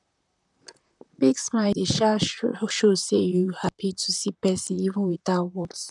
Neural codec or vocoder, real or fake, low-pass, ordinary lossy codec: vocoder, 44.1 kHz, 128 mel bands, Pupu-Vocoder; fake; 10.8 kHz; none